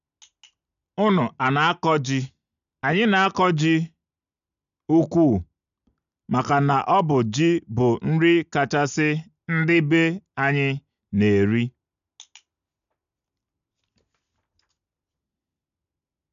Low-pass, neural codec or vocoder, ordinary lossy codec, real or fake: 7.2 kHz; none; none; real